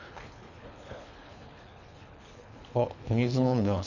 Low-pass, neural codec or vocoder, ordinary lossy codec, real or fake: 7.2 kHz; codec, 24 kHz, 3 kbps, HILCodec; none; fake